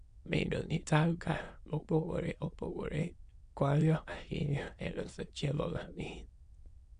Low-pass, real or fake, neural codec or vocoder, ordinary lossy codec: 9.9 kHz; fake; autoencoder, 22.05 kHz, a latent of 192 numbers a frame, VITS, trained on many speakers; MP3, 64 kbps